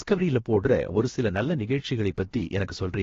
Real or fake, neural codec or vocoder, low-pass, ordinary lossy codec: fake; codec, 16 kHz, about 1 kbps, DyCAST, with the encoder's durations; 7.2 kHz; AAC, 32 kbps